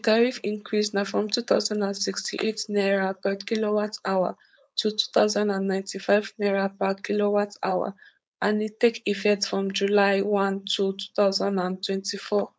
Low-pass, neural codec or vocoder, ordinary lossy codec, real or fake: none; codec, 16 kHz, 4.8 kbps, FACodec; none; fake